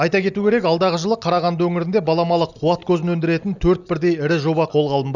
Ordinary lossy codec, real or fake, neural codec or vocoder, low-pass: none; real; none; 7.2 kHz